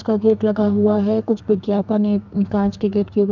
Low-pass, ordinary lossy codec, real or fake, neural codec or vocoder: 7.2 kHz; none; fake; codec, 32 kHz, 1.9 kbps, SNAC